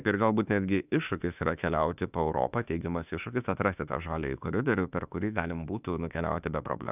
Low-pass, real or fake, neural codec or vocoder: 3.6 kHz; fake; autoencoder, 48 kHz, 32 numbers a frame, DAC-VAE, trained on Japanese speech